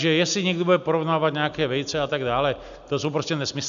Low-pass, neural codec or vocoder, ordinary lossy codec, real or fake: 7.2 kHz; none; AAC, 96 kbps; real